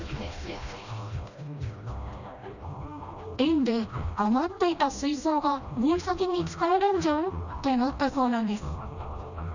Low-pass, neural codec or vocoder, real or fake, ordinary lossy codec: 7.2 kHz; codec, 16 kHz, 1 kbps, FreqCodec, smaller model; fake; none